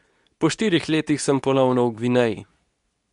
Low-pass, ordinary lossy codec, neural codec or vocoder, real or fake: 10.8 kHz; none; codec, 24 kHz, 0.9 kbps, WavTokenizer, medium speech release version 2; fake